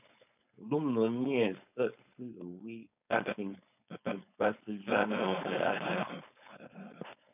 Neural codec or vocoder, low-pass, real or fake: codec, 16 kHz, 4.8 kbps, FACodec; 3.6 kHz; fake